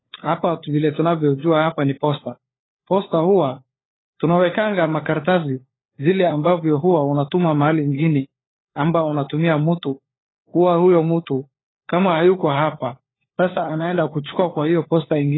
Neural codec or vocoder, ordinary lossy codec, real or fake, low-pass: codec, 16 kHz, 4 kbps, FunCodec, trained on LibriTTS, 50 frames a second; AAC, 16 kbps; fake; 7.2 kHz